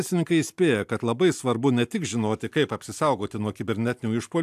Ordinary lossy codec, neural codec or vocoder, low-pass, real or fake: AAC, 96 kbps; none; 14.4 kHz; real